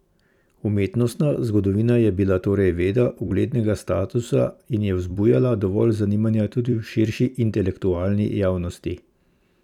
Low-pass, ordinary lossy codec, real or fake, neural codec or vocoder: 19.8 kHz; none; fake; vocoder, 44.1 kHz, 128 mel bands every 256 samples, BigVGAN v2